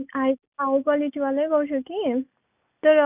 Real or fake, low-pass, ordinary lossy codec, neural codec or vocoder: real; 3.6 kHz; none; none